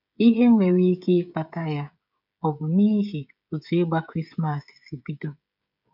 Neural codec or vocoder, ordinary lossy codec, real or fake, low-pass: codec, 16 kHz, 16 kbps, FreqCodec, smaller model; none; fake; 5.4 kHz